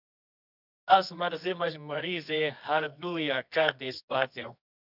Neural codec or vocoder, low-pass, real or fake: codec, 24 kHz, 0.9 kbps, WavTokenizer, medium music audio release; 5.4 kHz; fake